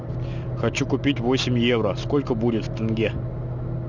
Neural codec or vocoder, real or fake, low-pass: none; real; 7.2 kHz